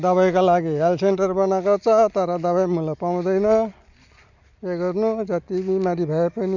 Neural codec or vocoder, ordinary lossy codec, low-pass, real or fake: none; none; 7.2 kHz; real